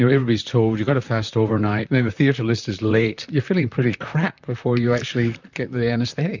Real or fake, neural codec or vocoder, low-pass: fake; vocoder, 44.1 kHz, 128 mel bands, Pupu-Vocoder; 7.2 kHz